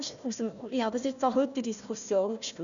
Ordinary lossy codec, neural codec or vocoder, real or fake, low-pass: none; codec, 16 kHz, 1 kbps, FunCodec, trained on Chinese and English, 50 frames a second; fake; 7.2 kHz